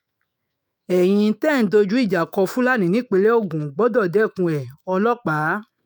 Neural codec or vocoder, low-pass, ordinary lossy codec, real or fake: autoencoder, 48 kHz, 128 numbers a frame, DAC-VAE, trained on Japanese speech; none; none; fake